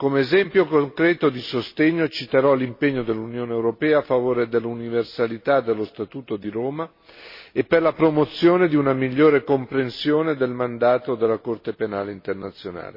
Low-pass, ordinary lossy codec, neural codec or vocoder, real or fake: 5.4 kHz; MP3, 24 kbps; none; real